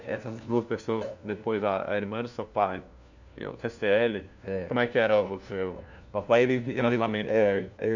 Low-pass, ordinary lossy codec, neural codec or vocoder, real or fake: 7.2 kHz; none; codec, 16 kHz, 1 kbps, FunCodec, trained on LibriTTS, 50 frames a second; fake